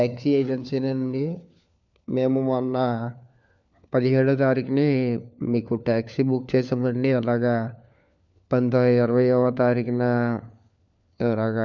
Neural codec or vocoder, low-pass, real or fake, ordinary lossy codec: codec, 16 kHz, 4 kbps, FunCodec, trained on LibriTTS, 50 frames a second; 7.2 kHz; fake; none